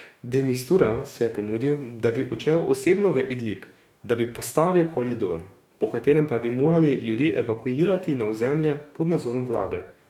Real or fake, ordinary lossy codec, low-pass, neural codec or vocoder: fake; none; 19.8 kHz; codec, 44.1 kHz, 2.6 kbps, DAC